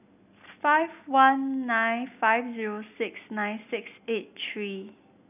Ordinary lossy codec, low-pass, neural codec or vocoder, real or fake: none; 3.6 kHz; none; real